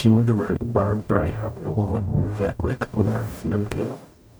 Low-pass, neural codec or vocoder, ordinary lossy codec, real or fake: none; codec, 44.1 kHz, 0.9 kbps, DAC; none; fake